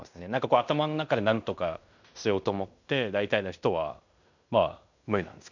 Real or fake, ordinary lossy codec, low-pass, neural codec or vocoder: fake; none; 7.2 kHz; codec, 16 kHz in and 24 kHz out, 0.9 kbps, LongCat-Audio-Codec, fine tuned four codebook decoder